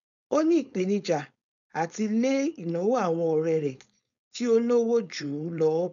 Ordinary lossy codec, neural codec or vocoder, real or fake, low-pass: none; codec, 16 kHz, 4.8 kbps, FACodec; fake; 7.2 kHz